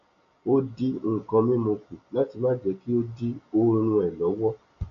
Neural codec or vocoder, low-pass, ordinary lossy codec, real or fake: none; 7.2 kHz; AAC, 48 kbps; real